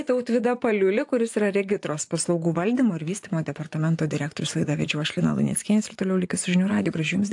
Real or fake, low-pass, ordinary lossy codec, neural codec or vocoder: fake; 10.8 kHz; AAC, 64 kbps; vocoder, 24 kHz, 100 mel bands, Vocos